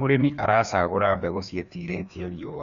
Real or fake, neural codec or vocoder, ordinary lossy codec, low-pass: fake; codec, 16 kHz, 2 kbps, FreqCodec, larger model; none; 7.2 kHz